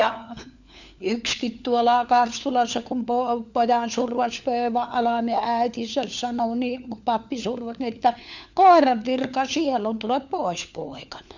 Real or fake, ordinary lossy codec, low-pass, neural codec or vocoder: fake; none; 7.2 kHz; codec, 16 kHz, 4 kbps, FunCodec, trained on LibriTTS, 50 frames a second